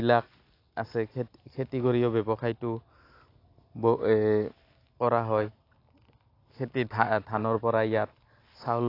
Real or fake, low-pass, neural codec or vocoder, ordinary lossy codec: real; 5.4 kHz; none; AAC, 32 kbps